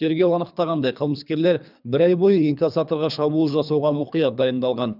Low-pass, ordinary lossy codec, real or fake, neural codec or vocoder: 5.4 kHz; none; fake; codec, 24 kHz, 3 kbps, HILCodec